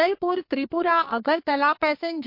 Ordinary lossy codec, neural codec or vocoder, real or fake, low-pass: MP3, 32 kbps; codec, 44.1 kHz, 2.6 kbps, SNAC; fake; 5.4 kHz